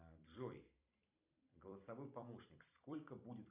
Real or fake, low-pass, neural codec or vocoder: fake; 3.6 kHz; vocoder, 44.1 kHz, 128 mel bands every 256 samples, BigVGAN v2